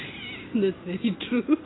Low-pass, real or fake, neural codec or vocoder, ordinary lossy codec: 7.2 kHz; real; none; AAC, 16 kbps